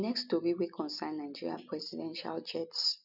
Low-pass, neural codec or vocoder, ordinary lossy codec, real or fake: 5.4 kHz; none; none; real